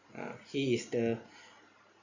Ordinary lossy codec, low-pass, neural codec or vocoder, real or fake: Opus, 64 kbps; 7.2 kHz; none; real